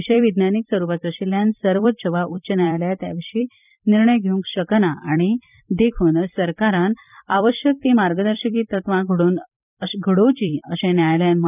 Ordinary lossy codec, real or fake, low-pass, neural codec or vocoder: none; fake; 3.6 kHz; vocoder, 44.1 kHz, 128 mel bands every 256 samples, BigVGAN v2